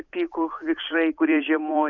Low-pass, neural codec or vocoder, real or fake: 7.2 kHz; vocoder, 44.1 kHz, 128 mel bands every 256 samples, BigVGAN v2; fake